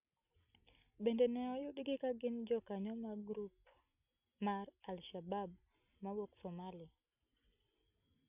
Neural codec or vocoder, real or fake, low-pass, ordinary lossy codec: none; real; 3.6 kHz; none